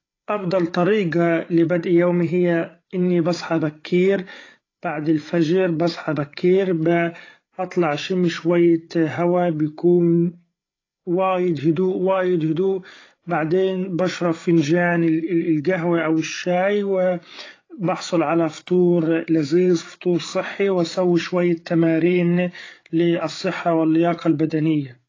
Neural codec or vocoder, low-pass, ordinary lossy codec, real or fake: codec, 16 kHz, 8 kbps, FreqCodec, larger model; 7.2 kHz; AAC, 32 kbps; fake